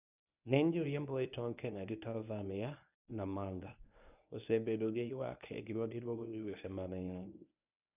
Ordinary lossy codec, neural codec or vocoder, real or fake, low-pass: none; codec, 24 kHz, 0.9 kbps, WavTokenizer, medium speech release version 1; fake; 3.6 kHz